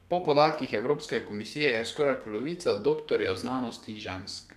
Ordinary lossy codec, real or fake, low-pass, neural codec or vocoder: none; fake; 14.4 kHz; codec, 32 kHz, 1.9 kbps, SNAC